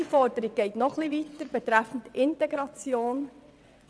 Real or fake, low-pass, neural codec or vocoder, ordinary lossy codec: fake; none; vocoder, 22.05 kHz, 80 mel bands, WaveNeXt; none